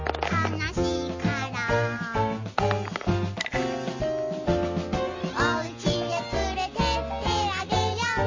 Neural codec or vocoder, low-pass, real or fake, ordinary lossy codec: none; 7.2 kHz; real; MP3, 32 kbps